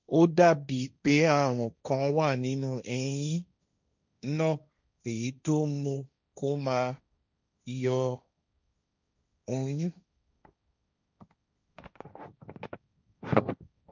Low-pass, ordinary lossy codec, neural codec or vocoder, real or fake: 7.2 kHz; none; codec, 16 kHz, 1.1 kbps, Voila-Tokenizer; fake